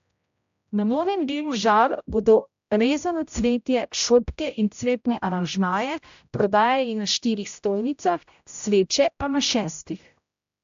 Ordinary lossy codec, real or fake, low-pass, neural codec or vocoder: AAC, 64 kbps; fake; 7.2 kHz; codec, 16 kHz, 0.5 kbps, X-Codec, HuBERT features, trained on general audio